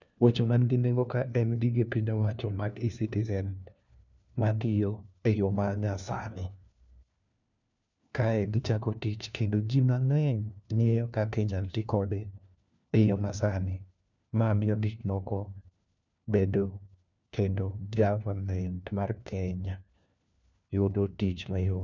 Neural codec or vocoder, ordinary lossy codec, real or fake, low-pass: codec, 16 kHz, 1 kbps, FunCodec, trained on LibriTTS, 50 frames a second; none; fake; 7.2 kHz